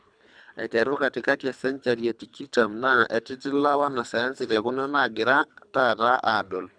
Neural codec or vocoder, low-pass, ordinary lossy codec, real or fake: codec, 24 kHz, 3 kbps, HILCodec; 9.9 kHz; none; fake